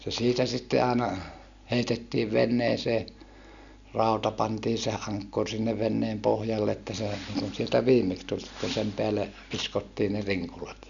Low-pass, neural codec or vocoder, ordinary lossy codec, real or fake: 7.2 kHz; none; none; real